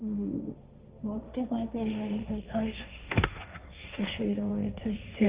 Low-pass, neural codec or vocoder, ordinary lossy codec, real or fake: 3.6 kHz; codec, 16 kHz, 1.1 kbps, Voila-Tokenizer; Opus, 32 kbps; fake